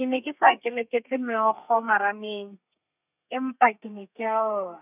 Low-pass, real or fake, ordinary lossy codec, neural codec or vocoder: 3.6 kHz; fake; none; codec, 32 kHz, 1.9 kbps, SNAC